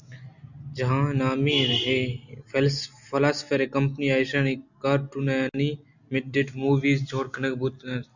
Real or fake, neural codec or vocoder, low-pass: real; none; 7.2 kHz